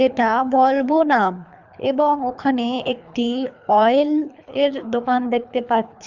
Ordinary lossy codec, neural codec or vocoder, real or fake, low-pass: none; codec, 24 kHz, 3 kbps, HILCodec; fake; 7.2 kHz